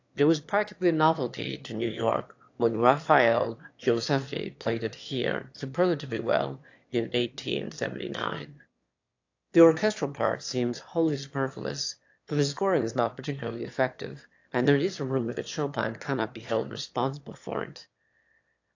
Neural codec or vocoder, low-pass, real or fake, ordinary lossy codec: autoencoder, 22.05 kHz, a latent of 192 numbers a frame, VITS, trained on one speaker; 7.2 kHz; fake; AAC, 48 kbps